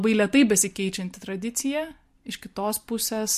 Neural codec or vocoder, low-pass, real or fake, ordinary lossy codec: none; 14.4 kHz; real; MP3, 64 kbps